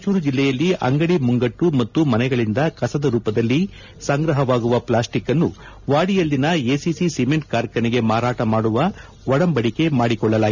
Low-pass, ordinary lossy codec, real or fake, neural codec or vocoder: 7.2 kHz; none; real; none